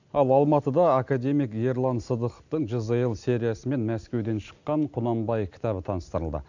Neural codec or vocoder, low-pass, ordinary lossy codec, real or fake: none; 7.2 kHz; none; real